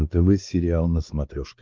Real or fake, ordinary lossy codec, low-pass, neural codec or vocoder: fake; Opus, 32 kbps; 7.2 kHz; codec, 16 kHz, 4 kbps, X-Codec, WavLM features, trained on Multilingual LibriSpeech